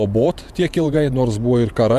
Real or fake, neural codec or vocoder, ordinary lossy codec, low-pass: fake; vocoder, 48 kHz, 128 mel bands, Vocos; MP3, 96 kbps; 14.4 kHz